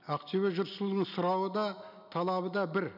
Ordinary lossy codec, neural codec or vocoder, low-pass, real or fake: none; none; 5.4 kHz; real